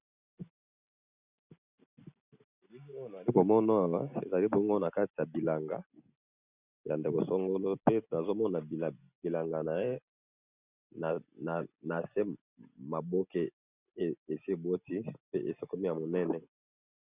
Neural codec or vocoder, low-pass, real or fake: none; 3.6 kHz; real